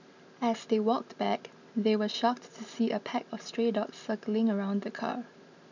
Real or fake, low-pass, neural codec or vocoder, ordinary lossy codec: real; 7.2 kHz; none; none